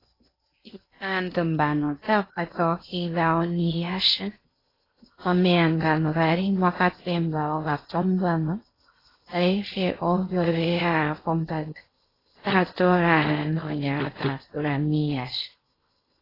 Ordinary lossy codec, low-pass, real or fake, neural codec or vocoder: AAC, 24 kbps; 5.4 kHz; fake; codec, 16 kHz in and 24 kHz out, 0.6 kbps, FocalCodec, streaming, 2048 codes